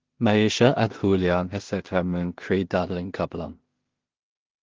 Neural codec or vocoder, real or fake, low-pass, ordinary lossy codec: codec, 16 kHz in and 24 kHz out, 0.4 kbps, LongCat-Audio-Codec, two codebook decoder; fake; 7.2 kHz; Opus, 16 kbps